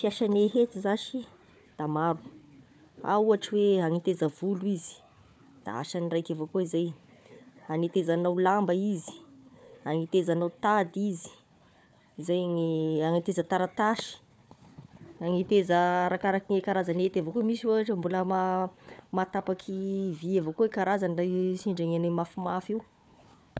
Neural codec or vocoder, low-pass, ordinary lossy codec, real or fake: codec, 16 kHz, 4 kbps, FunCodec, trained on Chinese and English, 50 frames a second; none; none; fake